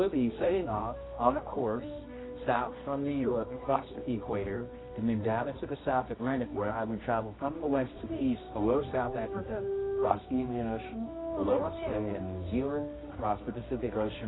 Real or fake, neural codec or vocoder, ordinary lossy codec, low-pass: fake; codec, 24 kHz, 0.9 kbps, WavTokenizer, medium music audio release; AAC, 16 kbps; 7.2 kHz